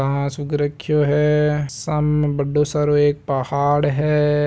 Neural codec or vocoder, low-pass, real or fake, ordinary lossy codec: none; none; real; none